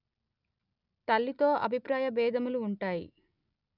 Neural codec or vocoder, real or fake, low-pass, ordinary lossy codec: none; real; 5.4 kHz; none